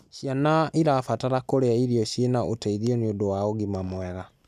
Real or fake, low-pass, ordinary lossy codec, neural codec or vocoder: real; 14.4 kHz; none; none